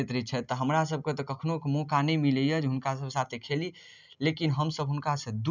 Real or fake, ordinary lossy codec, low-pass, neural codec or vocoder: real; none; 7.2 kHz; none